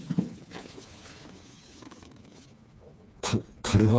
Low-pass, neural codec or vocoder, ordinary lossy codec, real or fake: none; codec, 16 kHz, 4 kbps, FreqCodec, smaller model; none; fake